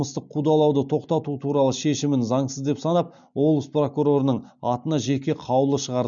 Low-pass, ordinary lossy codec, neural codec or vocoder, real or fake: 7.2 kHz; none; none; real